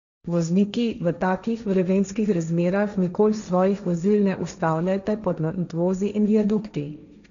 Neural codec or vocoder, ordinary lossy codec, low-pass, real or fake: codec, 16 kHz, 1.1 kbps, Voila-Tokenizer; none; 7.2 kHz; fake